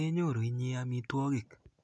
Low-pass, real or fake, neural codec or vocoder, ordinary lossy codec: none; real; none; none